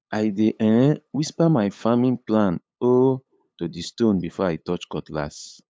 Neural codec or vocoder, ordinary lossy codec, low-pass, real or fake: codec, 16 kHz, 8 kbps, FunCodec, trained on LibriTTS, 25 frames a second; none; none; fake